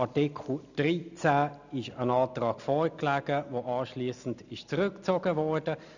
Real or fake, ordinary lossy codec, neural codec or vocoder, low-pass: real; none; none; 7.2 kHz